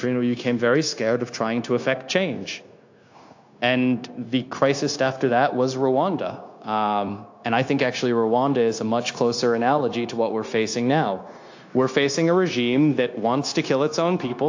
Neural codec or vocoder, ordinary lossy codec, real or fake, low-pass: codec, 16 kHz, 0.9 kbps, LongCat-Audio-Codec; AAC, 48 kbps; fake; 7.2 kHz